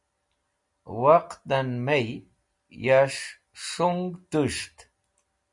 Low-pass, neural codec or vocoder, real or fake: 10.8 kHz; none; real